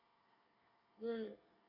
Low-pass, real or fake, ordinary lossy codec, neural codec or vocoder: 5.4 kHz; fake; none; codec, 24 kHz, 1 kbps, SNAC